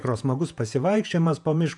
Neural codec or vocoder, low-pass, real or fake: vocoder, 48 kHz, 128 mel bands, Vocos; 10.8 kHz; fake